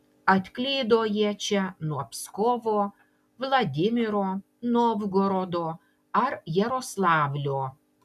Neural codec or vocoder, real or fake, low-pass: none; real; 14.4 kHz